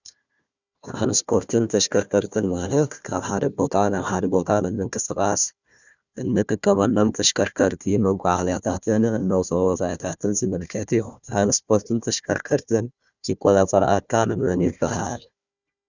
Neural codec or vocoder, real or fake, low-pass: codec, 16 kHz, 1 kbps, FunCodec, trained on Chinese and English, 50 frames a second; fake; 7.2 kHz